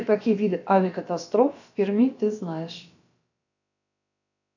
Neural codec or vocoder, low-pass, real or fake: codec, 16 kHz, about 1 kbps, DyCAST, with the encoder's durations; 7.2 kHz; fake